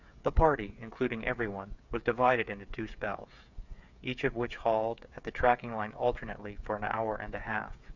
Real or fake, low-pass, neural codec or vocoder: fake; 7.2 kHz; codec, 16 kHz, 8 kbps, FreqCodec, smaller model